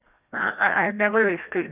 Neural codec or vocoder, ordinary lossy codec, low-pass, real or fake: codec, 16 kHz, 1 kbps, FreqCodec, larger model; none; 3.6 kHz; fake